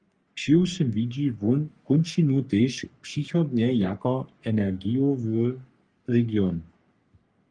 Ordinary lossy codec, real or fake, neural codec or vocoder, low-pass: Opus, 32 kbps; fake; codec, 44.1 kHz, 3.4 kbps, Pupu-Codec; 9.9 kHz